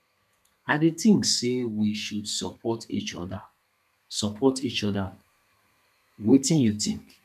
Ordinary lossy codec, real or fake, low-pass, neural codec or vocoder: none; fake; 14.4 kHz; codec, 32 kHz, 1.9 kbps, SNAC